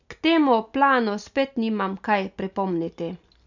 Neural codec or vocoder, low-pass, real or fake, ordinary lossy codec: none; 7.2 kHz; real; none